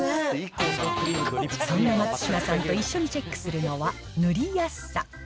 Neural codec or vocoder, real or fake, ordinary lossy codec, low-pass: none; real; none; none